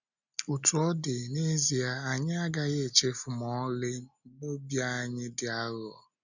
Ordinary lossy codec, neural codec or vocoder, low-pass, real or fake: none; none; 7.2 kHz; real